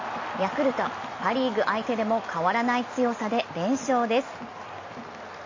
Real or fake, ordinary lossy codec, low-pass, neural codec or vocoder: real; MP3, 32 kbps; 7.2 kHz; none